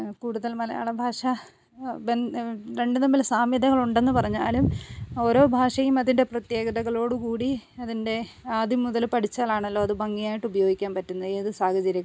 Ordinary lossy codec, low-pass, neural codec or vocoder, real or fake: none; none; none; real